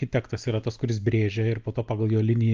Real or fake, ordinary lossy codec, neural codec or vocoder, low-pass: real; Opus, 24 kbps; none; 7.2 kHz